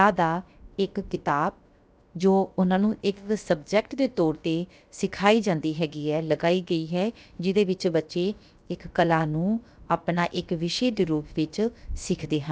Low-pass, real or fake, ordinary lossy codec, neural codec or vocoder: none; fake; none; codec, 16 kHz, about 1 kbps, DyCAST, with the encoder's durations